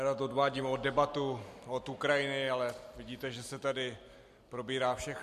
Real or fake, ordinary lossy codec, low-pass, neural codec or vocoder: real; MP3, 64 kbps; 14.4 kHz; none